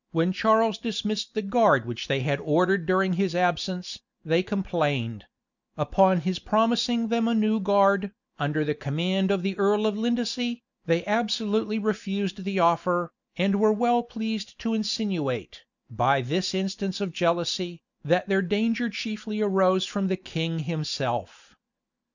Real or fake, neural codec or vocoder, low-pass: real; none; 7.2 kHz